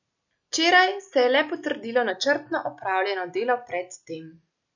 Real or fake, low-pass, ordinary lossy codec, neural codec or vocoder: real; 7.2 kHz; none; none